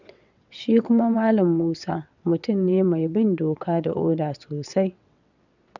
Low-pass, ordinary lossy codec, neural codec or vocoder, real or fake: 7.2 kHz; none; vocoder, 22.05 kHz, 80 mel bands, WaveNeXt; fake